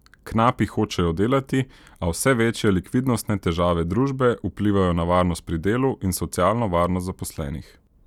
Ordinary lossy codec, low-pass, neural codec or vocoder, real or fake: none; 19.8 kHz; none; real